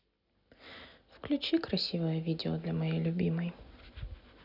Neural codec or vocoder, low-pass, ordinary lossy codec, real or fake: none; 5.4 kHz; none; real